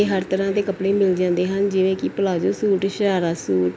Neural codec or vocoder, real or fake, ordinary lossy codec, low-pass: none; real; none; none